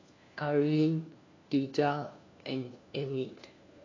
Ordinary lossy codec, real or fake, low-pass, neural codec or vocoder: AAC, 32 kbps; fake; 7.2 kHz; codec, 16 kHz, 1 kbps, FunCodec, trained on LibriTTS, 50 frames a second